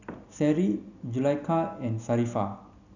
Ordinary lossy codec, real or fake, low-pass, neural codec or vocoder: none; real; 7.2 kHz; none